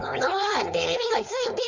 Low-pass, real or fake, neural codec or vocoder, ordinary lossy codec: 7.2 kHz; fake; codec, 16 kHz, 4.8 kbps, FACodec; Opus, 64 kbps